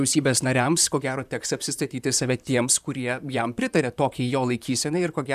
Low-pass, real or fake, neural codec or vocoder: 14.4 kHz; fake; vocoder, 44.1 kHz, 128 mel bands, Pupu-Vocoder